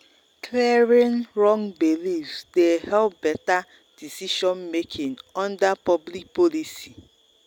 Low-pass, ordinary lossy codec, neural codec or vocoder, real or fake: 19.8 kHz; none; none; real